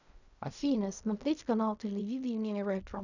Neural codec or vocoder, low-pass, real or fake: codec, 16 kHz in and 24 kHz out, 0.4 kbps, LongCat-Audio-Codec, fine tuned four codebook decoder; 7.2 kHz; fake